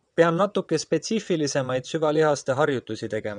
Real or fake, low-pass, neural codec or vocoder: fake; 9.9 kHz; vocoder, 22.05 kHz, 80 mel bands, WaveNeXt